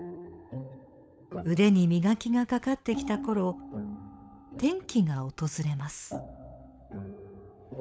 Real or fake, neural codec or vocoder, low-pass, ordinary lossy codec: fake; codec, 16 kHz, 16 kbps, FunCodec, trained on LibriTTS, 50 frames a second; none; none